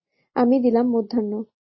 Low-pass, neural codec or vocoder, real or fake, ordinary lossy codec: 7.2 kHz; none; real; MP3, 24 kbps